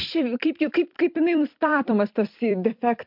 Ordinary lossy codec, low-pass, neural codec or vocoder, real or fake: MP3, 48 kbps; 5.4 kHz; vocoder, 44.1 kHz, 128 mel bands every 256 samples, BigVGAN v2; fake